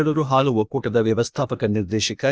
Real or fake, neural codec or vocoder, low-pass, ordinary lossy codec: fake; codec, 16 kHz, 0.8 kbps, ZipCodec; none; none